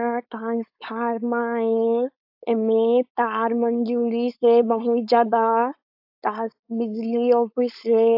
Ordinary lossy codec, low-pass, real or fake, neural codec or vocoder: AAC, 48 kbps; 5.4 kHz; fake; codec, 16 kHz, 4.8 kbps, FACodec